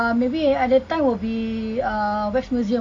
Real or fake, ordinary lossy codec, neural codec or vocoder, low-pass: real; none; none; none